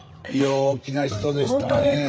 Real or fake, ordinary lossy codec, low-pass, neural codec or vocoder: fake; none; none; codec, 16 kHz, 8 kbps, FreqCodec, larger model